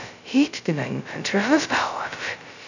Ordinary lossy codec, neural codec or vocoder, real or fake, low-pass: none; codec, 16 kHz, 0.2 kbps, FocalCodec; fake; 7.2 kHz